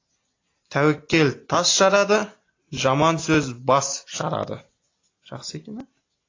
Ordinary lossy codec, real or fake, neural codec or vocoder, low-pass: AAC, 32 kbps; real; none; 7.2 kHz